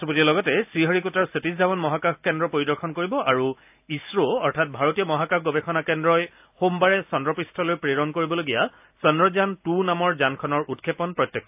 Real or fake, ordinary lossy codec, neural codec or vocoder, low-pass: real; AAC, 32 kbps; none; 3.6 kHz